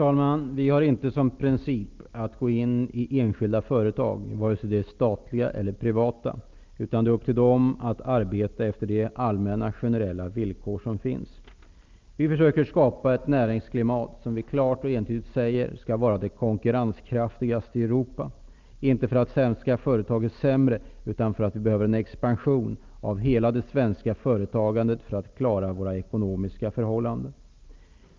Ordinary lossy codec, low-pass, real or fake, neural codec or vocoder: Opus, 24 kbps; 7.2 kHz; real; none